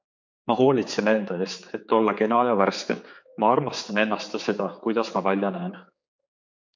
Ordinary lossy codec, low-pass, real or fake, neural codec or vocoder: MP3, 48 kbps; 7.2 kHz; fake; codec, 16 kHz, 4 kbps, X-Codec, HuBERT features, trained on general audio